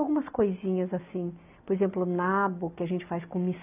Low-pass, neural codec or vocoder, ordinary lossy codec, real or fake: 3.6 kHz; none; AAC, 32 kbps; real